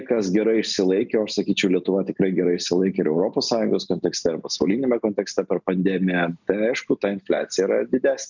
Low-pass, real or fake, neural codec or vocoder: 7.2 kHz; real; none